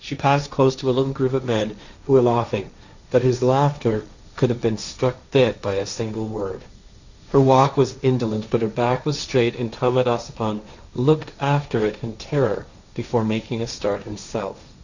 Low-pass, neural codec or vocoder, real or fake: 7.2 kHz; codec, 16 kHz, 1.1 kbps, Voila-Tokenizer; fake